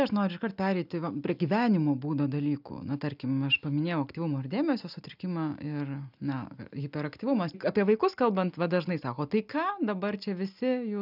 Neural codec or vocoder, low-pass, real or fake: none; 5.4 kHz; real